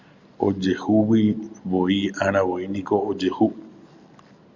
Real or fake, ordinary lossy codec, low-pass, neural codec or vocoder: real; Opus, 64 kbps; 7.2 kHz; none